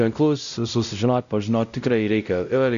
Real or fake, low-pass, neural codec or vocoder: fake; 7.2 kHz; codec, 16 kHz, 0.5 kbps, X-Codec, WavLM features, trained on Multilingual LibriSpeech